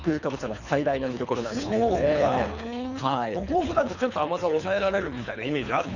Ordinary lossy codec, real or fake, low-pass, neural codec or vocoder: none; fake; 7.2 kHz; codec, 24 kHz, 3 kbps, HILCodec